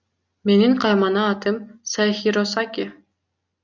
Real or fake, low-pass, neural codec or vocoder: real; 7.2 kHz; none